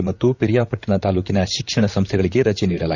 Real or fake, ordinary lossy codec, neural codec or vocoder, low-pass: fake; none; vocoder, 44.1 kHz, 128 mel bands, Pupu-Vocoder; 7.2 kHz